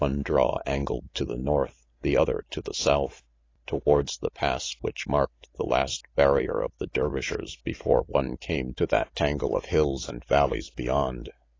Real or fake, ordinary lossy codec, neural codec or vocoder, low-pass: real; AAC, 32 kbps; none; 7.2 kHz